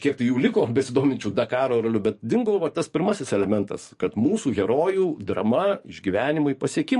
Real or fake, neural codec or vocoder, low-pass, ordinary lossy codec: fake; codec, 44.1 kHz, 7.8 kbps, DAC; 14.4 kHz; MP3, 48 kbps